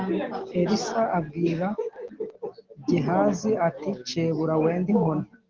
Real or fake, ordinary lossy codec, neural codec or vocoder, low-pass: real; Opus, 16 kbps; none; 7.2 kHz